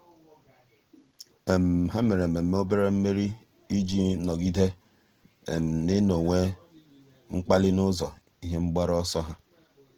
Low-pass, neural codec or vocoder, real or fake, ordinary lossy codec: 19.8 kHz; none; real; Opus, 16 kbps